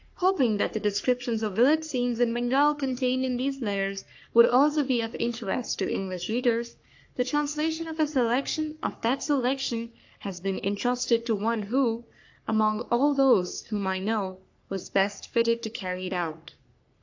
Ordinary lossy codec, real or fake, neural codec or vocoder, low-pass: AAC, 48 kbps; fake; codec, 44.1 kHz, 3.4 kbps, Pupu-Codec; 7.2 kHz